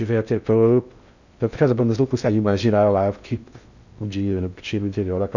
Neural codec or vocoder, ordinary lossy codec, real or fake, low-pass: codec, 16 kHz in and 24 kHz out, 0.6 kbps, FocalCodec, streaming, 2048 codes; none; fake; 7.2 kHz